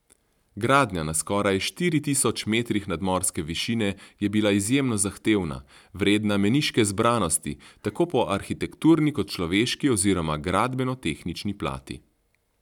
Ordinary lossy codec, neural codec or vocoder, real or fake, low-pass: none; none; real; 19.8 kHz